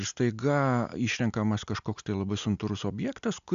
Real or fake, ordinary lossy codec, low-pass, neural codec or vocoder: real; AAC, 96 kbps; 7.2 kHz; none